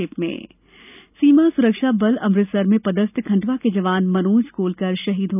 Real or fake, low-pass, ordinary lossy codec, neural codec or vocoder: real; 3.6 kHz; none; none